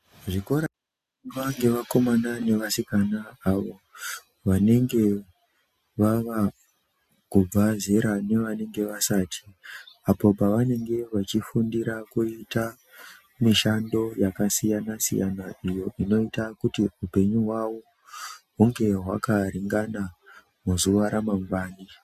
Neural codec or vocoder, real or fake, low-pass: none; real; 14.4 kHz